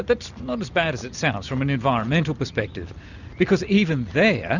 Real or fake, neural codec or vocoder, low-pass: real; none; 7.2 kHz